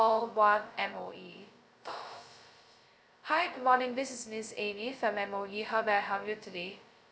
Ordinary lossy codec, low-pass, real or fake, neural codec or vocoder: none; none; fake; codec, 16 kHz, 0.2 kbps, FocalCodec